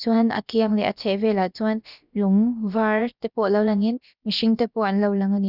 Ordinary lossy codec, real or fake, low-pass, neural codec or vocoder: none; fake; 5.4 kHz; codec, 16 kHz, about 1 kbps, DyCAST, with the encoder's durations